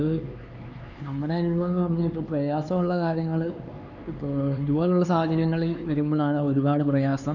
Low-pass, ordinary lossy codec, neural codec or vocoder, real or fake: 7.2 kHz; none; codec, 16 kHz, 4 kbps, X-Codec, HuBERT features, trained on LibriSpeech; fake